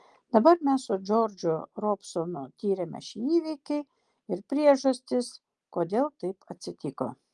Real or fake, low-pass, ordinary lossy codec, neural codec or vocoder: real; 10.8 kHz; Opus, 24 kbps; none